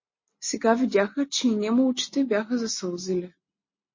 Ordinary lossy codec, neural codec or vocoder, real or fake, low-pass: MP3, 32 kbps; none; real; 7.2 kHz